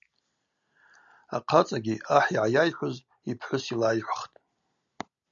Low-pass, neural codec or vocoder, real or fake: 7.2 kHz; none; real